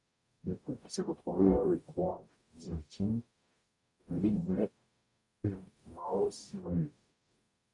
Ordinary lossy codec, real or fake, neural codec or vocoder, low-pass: MP3, 64 kbps; fake; codec, 44.1 kHz, 0.9 kbps, DAC; 10.8 kHz